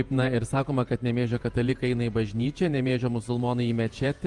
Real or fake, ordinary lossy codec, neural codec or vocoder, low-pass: fake; Opus, 32 kbps; vocoder, 48 kHz, 128 mel bands, Vocos; 10.8 kHz